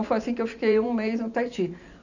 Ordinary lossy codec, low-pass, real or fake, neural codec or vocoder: none; 7.2 kHz; fake; vocoder, 44.1 kHz, 128 mel bands every 512 samples, BigVGAN v2